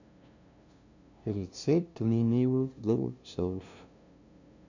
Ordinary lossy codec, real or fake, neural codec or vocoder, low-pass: none; fake; codec, 16 kHz, 0.5 kbps, FunCodec, trained on LibriTTS, 25 frames a second; 7.2 kHz